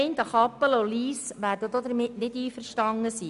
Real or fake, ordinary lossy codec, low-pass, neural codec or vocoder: real; none; 10.8 kHz; none